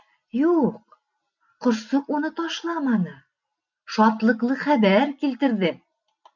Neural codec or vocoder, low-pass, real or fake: none; 7.2 kHz; real